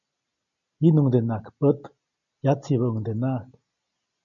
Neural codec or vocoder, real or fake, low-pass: none; real; 7.2 kHz